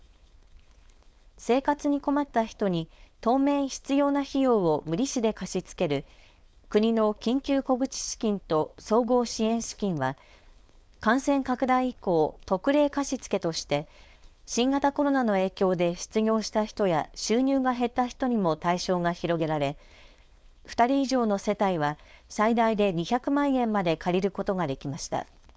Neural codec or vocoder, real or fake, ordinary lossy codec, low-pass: codec, 16 kHz, 4.8 kbps, FACodec; fake; none; none